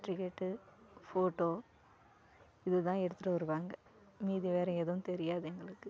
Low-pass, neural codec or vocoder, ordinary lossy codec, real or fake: none; none; none; real